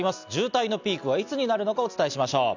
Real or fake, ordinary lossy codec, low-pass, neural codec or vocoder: real; none; 7.2 kHz; none